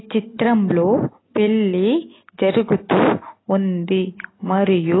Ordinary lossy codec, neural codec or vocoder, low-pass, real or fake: AAC, 16 kbps; none; 7.2 kHz; real